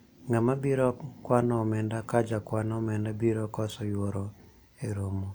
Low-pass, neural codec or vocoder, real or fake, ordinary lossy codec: none; none; real; none